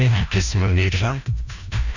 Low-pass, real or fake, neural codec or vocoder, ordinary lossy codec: 7.2 kHz; fake; codec, 16 kHz, 1 kbps, FreqCodec, larger model; none